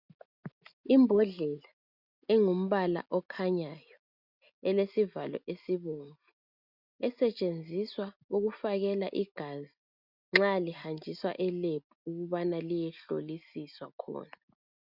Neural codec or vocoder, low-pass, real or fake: none; 5.4 kHz; real